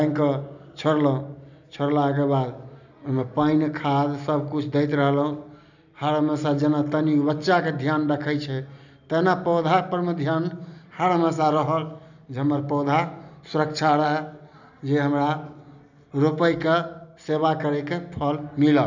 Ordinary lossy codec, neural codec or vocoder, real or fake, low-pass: none; none; real; 7.2 kHz